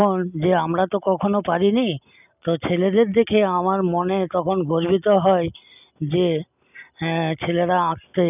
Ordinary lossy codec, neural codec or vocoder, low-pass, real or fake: none; none; 3.6 kHz; real